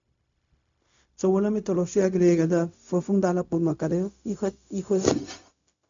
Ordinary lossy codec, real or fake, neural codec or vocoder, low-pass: MP3, 64 kbps; fake; codec, 16 kHz, 0.4 kbps, LongCat-Audio-Codec; 7.2 kHz